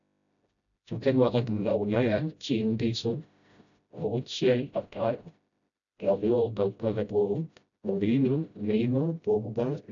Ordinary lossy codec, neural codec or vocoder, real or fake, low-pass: none; codec, 16 kHz, 0.5 kbps, FreqCodec, smaller model; fake; 7.2 kHz